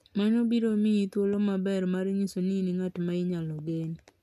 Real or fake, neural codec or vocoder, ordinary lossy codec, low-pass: real; none; none; 14.4 kHz